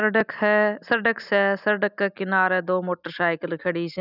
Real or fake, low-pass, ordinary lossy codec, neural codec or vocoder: real; 5.4 kHz; none; none